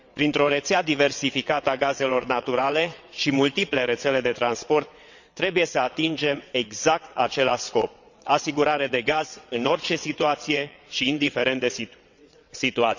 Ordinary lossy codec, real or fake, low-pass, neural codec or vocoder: none; fake; 7.2 kHz; vocoder, 22.05 kHz, 80 mel bands, WaveNeXt